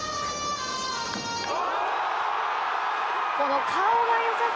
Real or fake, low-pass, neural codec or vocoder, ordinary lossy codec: real; none; none; none